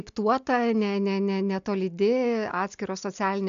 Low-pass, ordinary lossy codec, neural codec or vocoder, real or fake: 7.2 kHz; AAC, 96 kbps; none; real